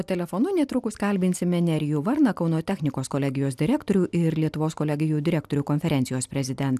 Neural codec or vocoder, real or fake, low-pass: none; real; 14.4 kHz